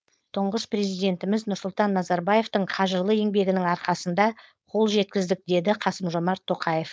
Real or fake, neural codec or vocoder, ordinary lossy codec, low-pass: fake; codec, 16 kHz, 4.8 kbps, FACodec; none; none